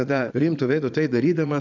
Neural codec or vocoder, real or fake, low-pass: vocoder, 22.05 kHz, 80 mel bands, Vocos; fake; 7.2 kHz